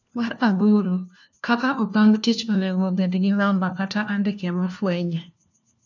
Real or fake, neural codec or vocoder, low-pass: fake; codec, 16 kHz, 1 kbps, FunCodec, trained on LibriTTS, 50 frames a second; 7.2 kHz